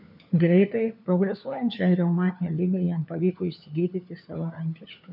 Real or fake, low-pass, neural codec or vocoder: fake; 5.4 kHz; codec, 16 kHz, 4 kbps, FunCodec, trained on LibriTTS, 50 frames a second